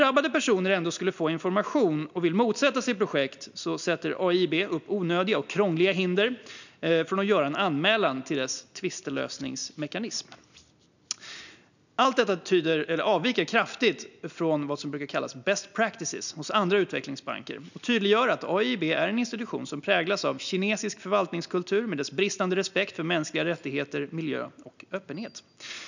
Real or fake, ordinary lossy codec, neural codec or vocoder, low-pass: real; none; none; 7.2 kHz